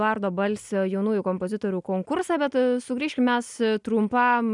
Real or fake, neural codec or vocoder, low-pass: real; none; 10.8 kHz